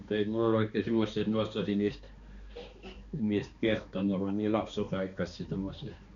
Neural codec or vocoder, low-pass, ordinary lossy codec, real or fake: codec, 16 kHz, 2 kbps, X-Codec, HuBERT features, trained on balanced general audio; 7.2 kHz; Opus, 64 kbps; fake